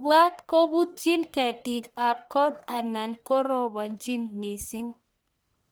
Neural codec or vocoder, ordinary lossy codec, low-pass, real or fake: codec, 44.1 kHz, 1.7 kbps, Pupu-Codec; none; none; fake